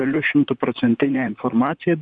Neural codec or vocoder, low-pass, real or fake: vocoder, 44.1 kHz, 128 mel bands, Pupu-Vocoder; 9.9 kHz; fake